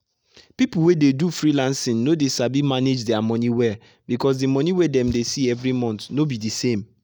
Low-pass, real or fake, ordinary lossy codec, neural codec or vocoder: none; real; none; none